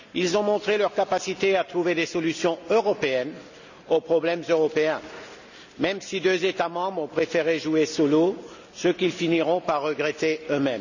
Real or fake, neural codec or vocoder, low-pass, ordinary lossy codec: real; none; 7.2 kHz; none